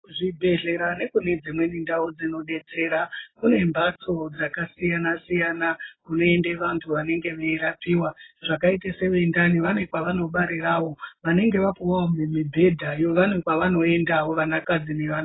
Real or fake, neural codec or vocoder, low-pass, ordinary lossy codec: real; none; 7.2 kHz; AAC, 16 kbps